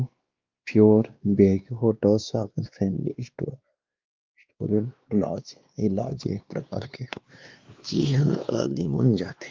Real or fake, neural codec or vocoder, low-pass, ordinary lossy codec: fake; codec, 16 kHz, 2 kbps, X-Codec, WavLM features, trained on Multilingual LibriSpeech; 7.2 kHz; Opus, 24 kbps